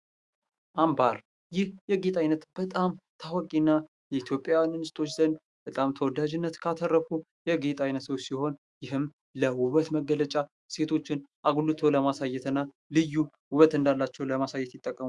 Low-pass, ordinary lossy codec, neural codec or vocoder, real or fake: 10.8 kHz; Opus, 64 kbps; autoencoder, 48 kHz, 128 numbers a frame, DAC-VAE, trained on Japanese speech; fake